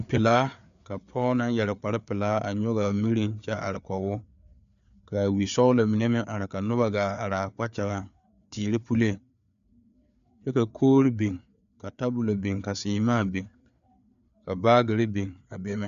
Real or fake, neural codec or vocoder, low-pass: fake; codec, 16 kHz, 4 kbps, FreqCodec, larger model; 7.2 kHz